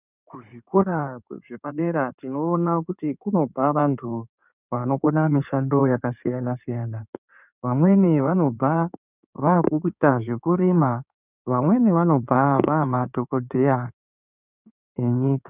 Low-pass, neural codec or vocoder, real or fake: 3.6 kHz; codec, 16 kHz in and 24 kHz out, 2.2 kbps, FireRedTTS-2 codec; fake